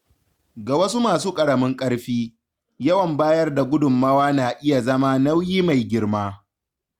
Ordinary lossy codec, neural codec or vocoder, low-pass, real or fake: Opus, 64 kbps; none; 19.8 kHz; real